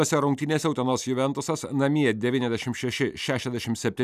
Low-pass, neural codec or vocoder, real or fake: 14.4 kHz; none; real